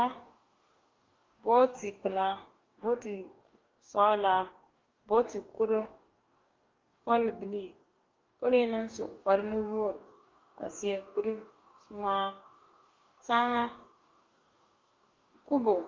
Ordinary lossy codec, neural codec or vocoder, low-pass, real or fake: Opus, 24 kbps; codec, 44.1 kHz, 2.6 kbps, DAC; 7.2 kHz; fake